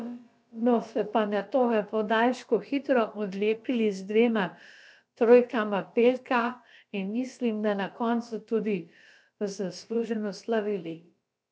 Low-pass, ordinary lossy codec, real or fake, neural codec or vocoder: none; none; fake; codec, 16 kHz, about 1 kbps, DyCAST, with the encoder's durations